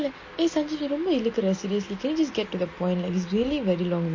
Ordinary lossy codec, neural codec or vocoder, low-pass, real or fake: MP3, 32 kbps; none; 7.2 kHz; real